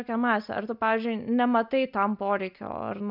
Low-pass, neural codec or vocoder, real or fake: 5.4 kHz; none; real